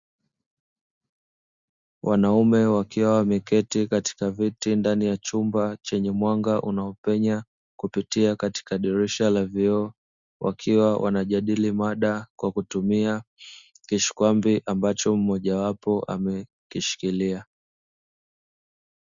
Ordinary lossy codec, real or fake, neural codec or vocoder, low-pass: Opus, 64 kbps; real; none; 7.2 kHz